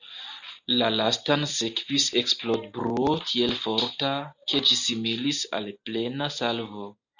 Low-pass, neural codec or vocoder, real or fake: 7.2 kHz; none; real